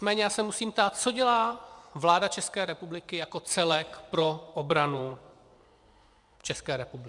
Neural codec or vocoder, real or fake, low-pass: vocoder, 24 kHz, 100 mel bands, Vocos; fake; 10.8 kHz